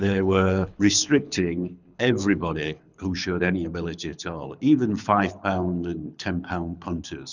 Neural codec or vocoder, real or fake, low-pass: codec, 24 kHz, 6 kbps, HILCodec; fake; 7.2 kHz